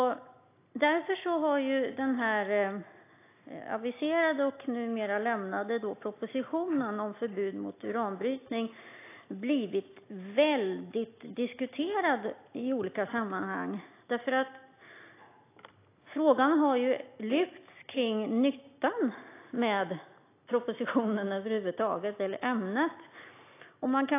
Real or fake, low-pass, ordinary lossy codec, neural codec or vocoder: real; 3.6 kHz; AAC, 24 kbps; none